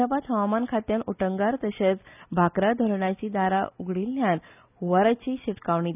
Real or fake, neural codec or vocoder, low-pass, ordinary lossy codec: real; none; 3.6 kHz; none